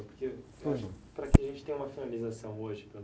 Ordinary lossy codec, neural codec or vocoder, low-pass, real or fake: none; none; none; real